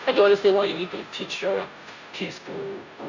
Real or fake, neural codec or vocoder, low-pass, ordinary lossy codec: fake; codec, 16 kHz, 0.5 kbps, FunCodec, trained on Chinese and English, 25 frames a second; 7.2 kHz; none